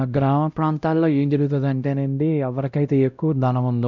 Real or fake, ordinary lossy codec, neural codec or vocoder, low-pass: fake; none; codec, 16 kHz, 0.5 kbps, X-Codec, WavLM features, trained on Multilingual LibriSpeech; 7.2 kHz